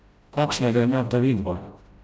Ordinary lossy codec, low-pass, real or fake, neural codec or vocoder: none; none; fake; codec, 16 kHz, 0.5 kbps, FreqCodec, smaller model